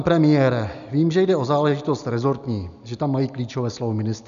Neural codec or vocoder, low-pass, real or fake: none; 7.2 kHz; real